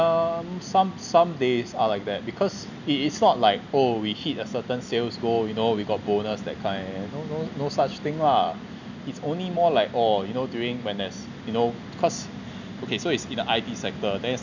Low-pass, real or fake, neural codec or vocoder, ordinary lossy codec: 7.2 kHz; real; none; none